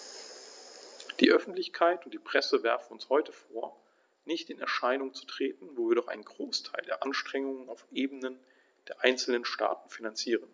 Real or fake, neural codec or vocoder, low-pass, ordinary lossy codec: real; none; none; none